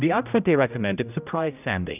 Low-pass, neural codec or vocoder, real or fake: 3.6 kHz; codec, 16 kHz, 0.5 kbps, X-Codec, HuBERT features, trained on general audio; fake